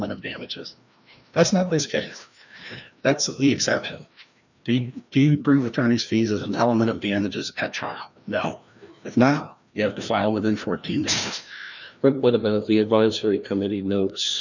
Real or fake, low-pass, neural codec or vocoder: fake; 7.2 kHz; codec, 16 kHz, 1 kbps, FreqCodec, larger model